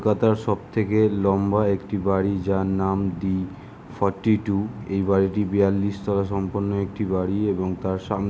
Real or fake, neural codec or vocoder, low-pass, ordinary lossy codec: real; none; none; none